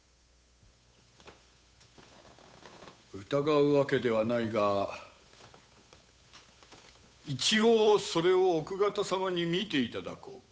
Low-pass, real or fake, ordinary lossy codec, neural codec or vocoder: none; fake; none; codec, 16 kHz, 8 kbps, FunCodec, trained on Chinese and English, 25 frames a second